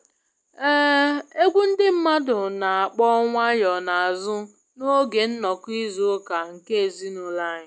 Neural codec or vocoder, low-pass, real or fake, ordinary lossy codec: none; none; real; none